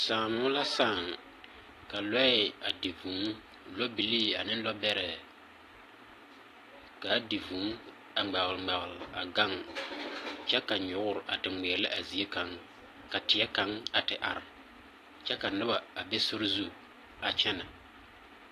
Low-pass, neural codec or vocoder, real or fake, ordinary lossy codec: 14.4 kHz; none; real; AAC, 48 kbps